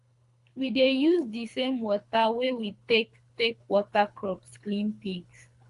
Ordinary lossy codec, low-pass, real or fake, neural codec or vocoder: AAC, 64 kbps; 10.8 kHz; fake; codec, 24 kHz, 3 kbps, HILCodec